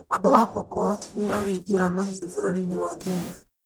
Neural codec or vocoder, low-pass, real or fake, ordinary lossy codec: codec, 44.1 kHz, 0.9 kbps, DAC; none; fake; none